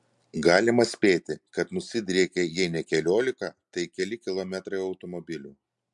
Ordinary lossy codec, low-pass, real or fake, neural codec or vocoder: MP3, 64 kbps; 10.8 kHz; real; none